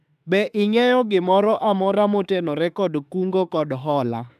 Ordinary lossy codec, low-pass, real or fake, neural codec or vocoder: none; 14.4 kHz; fake; autoencoder, 48 kHz, 32 numbers a frame, DAC-VAE, trained on Japanese speech